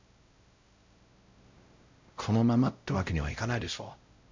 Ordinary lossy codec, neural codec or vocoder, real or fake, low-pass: MP3, 64 kbps; codec, 16 kHz, 0.5 kbps, X-Codec, WavLM features, trained on Multilingual LibriSpeech; fake; 7.2 kHz